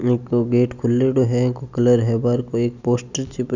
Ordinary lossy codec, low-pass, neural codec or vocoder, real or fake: none; 7.2 kHz; none; real